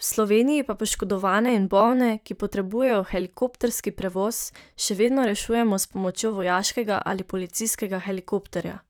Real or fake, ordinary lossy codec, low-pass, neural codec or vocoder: fake; none; none; vocoder, 44.1 kHz, 128 mel bands, Pupu-Vocoder